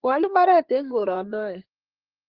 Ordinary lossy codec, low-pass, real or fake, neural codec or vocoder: Opus, 16 kbps; 5.4 kHz; fake; codec, 16 kHz in and 24 kHz out, 2.2 kbps, FireRedTTS-2 codec